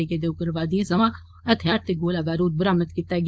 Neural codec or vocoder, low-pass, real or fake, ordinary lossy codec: codec, 16 kHz, 4.8 kbps, FACodec; none; fake; none